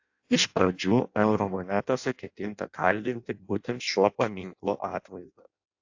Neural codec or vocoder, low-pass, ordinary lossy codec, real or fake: codec, 16 kHz in and 24 kHz out, 0.6 kbps, FireRedTTS-2 codec; 7.2 kHz; AAC, 48 kbps; fake